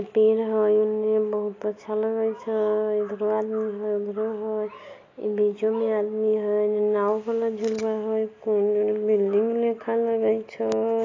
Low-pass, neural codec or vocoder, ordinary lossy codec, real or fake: 7.2 kHz; none; AAC, 32 kbps; real